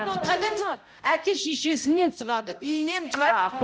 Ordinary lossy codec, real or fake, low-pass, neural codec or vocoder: none; fake; none; codec, 16 kHz, 0.5 kbps, X-Codec, HuBERT features, trained on balanced general audio